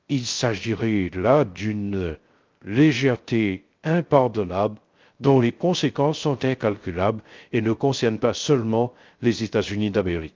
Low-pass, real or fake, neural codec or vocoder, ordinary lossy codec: 7.2 kHz; fake; codec, 16 kHz, 0.3 kbps, FocalCodec; Opus, 24 kbps